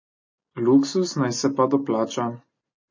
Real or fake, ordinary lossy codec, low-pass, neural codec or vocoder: real; MP3, 32 kbps; 7.2 kHz; none